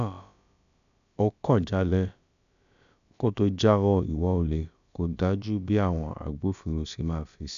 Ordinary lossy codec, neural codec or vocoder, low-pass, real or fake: none; codec, 16 kHz, about 1 kbps, DyCAST, with the encoder's durations; 7.2 kHz; fake